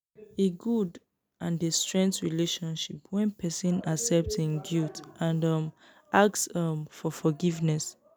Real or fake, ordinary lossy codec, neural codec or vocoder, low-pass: real; none; none; none